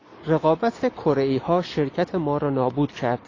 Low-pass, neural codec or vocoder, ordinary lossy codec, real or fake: 7.2 kHz; none; AAC, 32 kbps; real